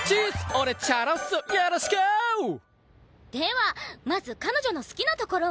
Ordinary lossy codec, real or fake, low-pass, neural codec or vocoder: none; real; none; none